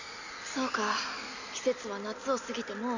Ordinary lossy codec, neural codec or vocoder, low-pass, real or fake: none; none; 7.2 kHz; real